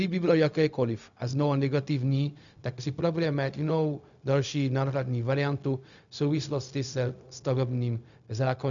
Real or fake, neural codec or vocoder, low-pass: fake; codec, 16 kHz, 0.4 kbps, LongCat-Audio-Codec; 7.2 kHz